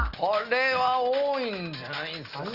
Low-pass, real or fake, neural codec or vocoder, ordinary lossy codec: 5.4 kHz; real; none; Opus, 24 kbps